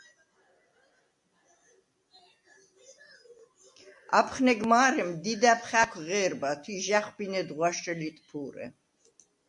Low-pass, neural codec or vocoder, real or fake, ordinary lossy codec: 10.8 kHz; none; real; MP3, 64 kbps